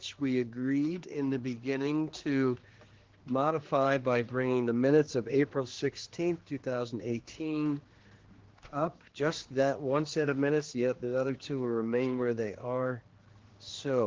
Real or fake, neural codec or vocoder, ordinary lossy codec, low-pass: fake; codec, 16 kHz, 2 kbps, X-Codec, HuBERT features, trained on general audio; Opus, 16 kbps; 7.2 kHz